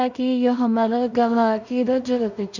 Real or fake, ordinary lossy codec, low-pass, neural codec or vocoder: fake; none; 7.2 kHz; codec, 16 kHz in and 24 kHz out, 0.4 kbps, LongCat-Audio-Codec, two codebook decoder